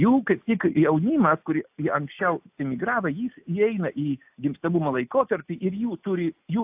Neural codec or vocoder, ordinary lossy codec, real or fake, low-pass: none; AAC, 32 kbps; real; 3.6 kHz